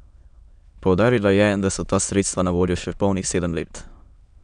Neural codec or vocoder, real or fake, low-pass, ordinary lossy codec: autoencoder, 22.05 kHz, a latent of 192 numbers a frame, VITS, trained on many speakers; fake; 9.9 kHz; none